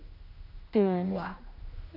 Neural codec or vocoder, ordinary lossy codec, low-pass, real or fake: codec, 16 kHz, 1 kbps, X-Codec, HuBERT features, trained on general audio; AAC, 48 kbps; 5.4 kHz; fake